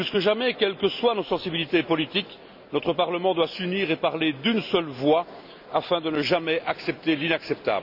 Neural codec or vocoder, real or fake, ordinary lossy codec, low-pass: none; real; none; 5.4 kHz